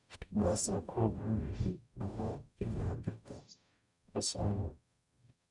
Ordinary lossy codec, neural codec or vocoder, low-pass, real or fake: none; codec, 44.1 kHz, 0.9 kbps, DAC; 10.8 kHz; fake